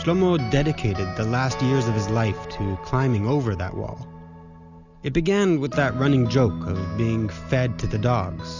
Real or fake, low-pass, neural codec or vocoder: real; 7.2 kHz; none